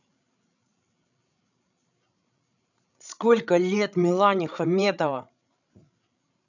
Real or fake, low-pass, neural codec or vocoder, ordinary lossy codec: fake; 7.2 kHz; codec, 16 kHz, 16 kbps, FreqCodec, larger model; none